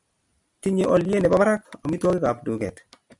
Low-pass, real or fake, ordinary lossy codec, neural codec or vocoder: 10.8 kHz; real; MP3, 96 kbps; none